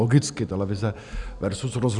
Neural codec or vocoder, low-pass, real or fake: none; 10.8 kHz; real